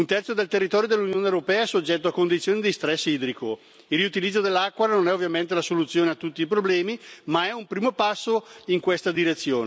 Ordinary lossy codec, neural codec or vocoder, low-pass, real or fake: none; none; none; real